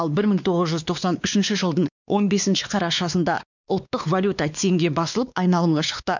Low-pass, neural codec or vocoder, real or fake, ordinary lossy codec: 7.2 kHz; codec, 16 kHz, 2 kbps, X-Codec, WavLM features, trained on Multilingual LibriSpeech; fake; none